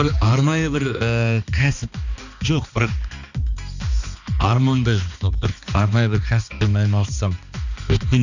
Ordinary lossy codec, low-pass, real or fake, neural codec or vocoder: none; 7.2 kHz; fake; codec, 16 kHz, 2 kbps, X-Codec, HuBERT features, trained on balanced general audio